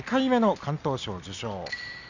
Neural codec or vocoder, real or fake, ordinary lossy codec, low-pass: none; real; none; 7.2 kHz